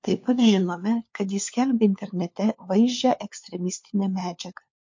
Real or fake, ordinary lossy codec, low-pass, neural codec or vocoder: fake; MP3, 48 kbps; 7.2 kHz; codec, 16 kHz, 4 kbps, FunCodec, trained on LibriTTS, 50 frames a second